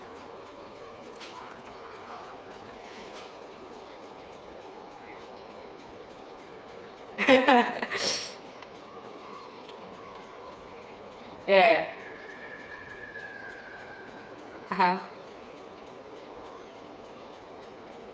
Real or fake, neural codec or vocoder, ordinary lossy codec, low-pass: fake; codec, 16 kHz, 4 kbps, FreqCodec, smaller model; none; none